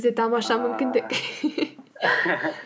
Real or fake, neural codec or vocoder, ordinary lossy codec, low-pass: real; none; none; none